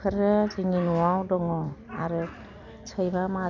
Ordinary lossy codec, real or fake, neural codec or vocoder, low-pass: none; real; none; 7.2 kHz